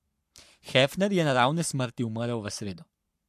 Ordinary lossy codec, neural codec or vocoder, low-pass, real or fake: MP3, 64 kbps; codec, 44.1 kHz, 7.8 kbps, Pupu-Codec; 14.4 kHz; fake